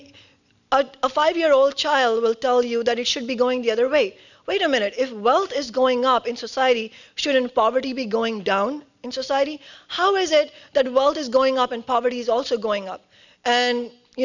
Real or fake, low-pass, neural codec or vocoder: real; 7.2 kHz; none